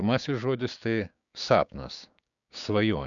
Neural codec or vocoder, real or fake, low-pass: codec, 16 kHz, 6 kbps, DAC; fake; 7.2 kHz